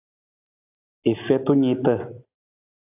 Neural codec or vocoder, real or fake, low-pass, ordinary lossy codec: none; real; 3.6 kHz; AAC, 32 kbps